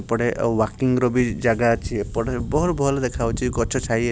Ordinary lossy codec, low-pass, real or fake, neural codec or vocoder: none; none; real; none